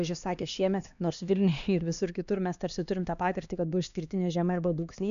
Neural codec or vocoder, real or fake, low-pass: codec, 16 kHz, 2 kbps, X-Codec, HuBERT features, trained on LibriSpeech; fake; 7.2 kHz